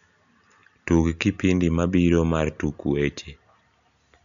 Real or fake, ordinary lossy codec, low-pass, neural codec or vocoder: real; none; 7.2 kHz; none